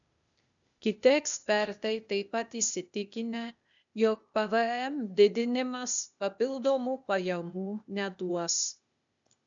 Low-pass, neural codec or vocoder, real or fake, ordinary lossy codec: 7.2 kHz; codec, 16 kHz, 0.8 kbps, ZipCodec; fake; MP3, 96 kbps